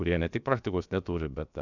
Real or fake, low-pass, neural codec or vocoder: fake; 7.2 kHz; codec, 16 kHz, about 1 kbps, DyCAST, with the encoder's durations